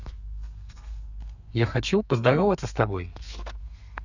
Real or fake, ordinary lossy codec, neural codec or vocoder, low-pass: fake; none; codec, 32 kHz, 1.9 kbps, SNAC; 7.2 kHz